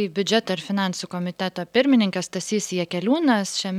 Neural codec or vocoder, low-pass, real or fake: none; 19.8 kHz; real